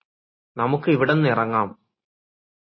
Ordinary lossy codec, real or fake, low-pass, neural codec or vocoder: MP3, 24 kbps; real; 7.2 kHz; none